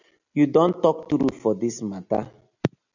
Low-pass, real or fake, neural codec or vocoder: 7.2 kHz; real; none